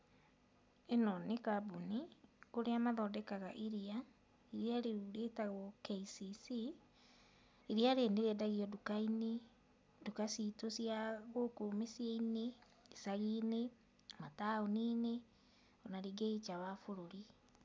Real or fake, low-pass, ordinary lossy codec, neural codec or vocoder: real; none; none; none